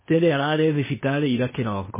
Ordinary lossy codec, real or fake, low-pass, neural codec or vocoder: MP3, 16 kbps; fake; 3.6 kHz; codec, 16 kHz, 0.8 kbps, ZipCodec